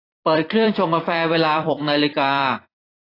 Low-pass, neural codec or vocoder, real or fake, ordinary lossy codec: 5.4 kHz; vocoder, 44.1 kHz, 128 mel bands every 256 samples, BigVGAN v2; fake; AAC, 24 kbps